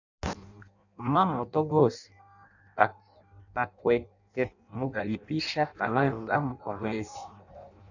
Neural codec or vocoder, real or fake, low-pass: codec, 16 kHz in and 24 kHz out, 0.6 kbps, FireRedTTS-2 codec; fake; 7.2 kHz